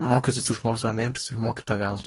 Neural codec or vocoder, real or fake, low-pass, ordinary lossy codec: codec, 24 kHz, 1.5 kbps, HILCodec; fake; 10.8 kHz; AAC, 32 kbps